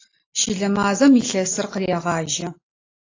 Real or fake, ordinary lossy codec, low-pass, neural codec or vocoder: real; AAC, 32 kbps; 7.2 kHz; none